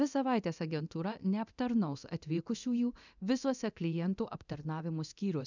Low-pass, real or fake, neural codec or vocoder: 7.2 kHz; fake; codec, 24 kHz, 0.9 kbps, DualCodec